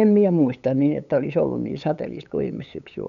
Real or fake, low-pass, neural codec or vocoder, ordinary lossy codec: fake; 7.2 kHz; codec, 16 kHz, 8 kbps, FunCodec, trained on LibriTTS, 25 frames a second; none